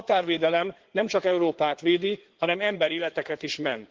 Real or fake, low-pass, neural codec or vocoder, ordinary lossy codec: fake; 7.2 kHz; codec, 16 kHz in and 24 kHz out, 2.2 kbps, FireRedTTS-2 codec; Opus, 16 kbps